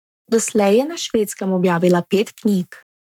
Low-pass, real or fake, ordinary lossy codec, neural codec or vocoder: 19.8 kHz; fake; none; codec, 44.1 kHz, 7.8 kbps, Pupu-Codec